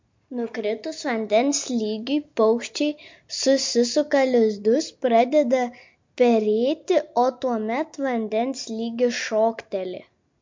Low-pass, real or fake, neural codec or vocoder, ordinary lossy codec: 7.2 kHz; real; none; MP3, 48 kbps